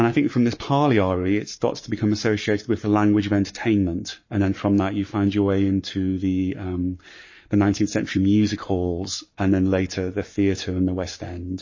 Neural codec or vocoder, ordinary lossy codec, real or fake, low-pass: codec, 44.1 kHz, 7.8 kbps, Pupu-Codec; MP3, 32 kbps; fake; 7.2 kHz